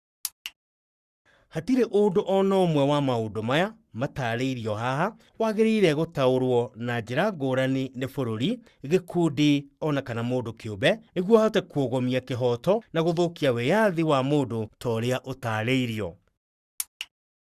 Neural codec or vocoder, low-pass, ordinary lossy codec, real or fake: codec, 44.1 kHz, 7.8 kbps, Pupu-Codec; 14.4 kHz; Opus, 64 kbps; fake